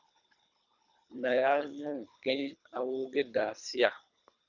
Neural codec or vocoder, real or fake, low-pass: codec, 24 kHz, 3 kbps, HILCodec; fake; 7.2 kHz